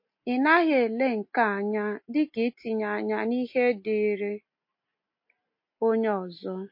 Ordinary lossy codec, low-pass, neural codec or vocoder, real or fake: MP3, 32 kbps; 5.4 kHz; none; real